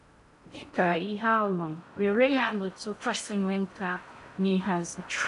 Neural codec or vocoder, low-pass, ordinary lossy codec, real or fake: codec, 16 kHz in and 24 kHz out, 0.6 kbps, FocalCodec, streaming, 4096 codes; 10.8 kHz; none; fake